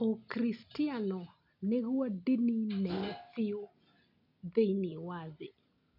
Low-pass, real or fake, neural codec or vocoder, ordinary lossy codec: 5.4 kHz; real; none; none